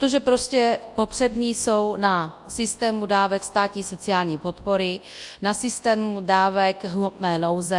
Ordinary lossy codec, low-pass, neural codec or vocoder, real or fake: AAC, 64 kbps; 10.8 kHz; codec, 24 kHz, 0.9 kbps, WavTokenizer, large speech release; fake